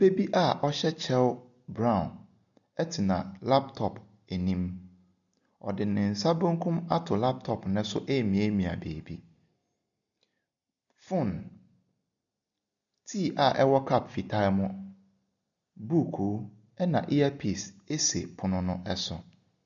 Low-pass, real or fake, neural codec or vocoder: 7.2 kHz; real; none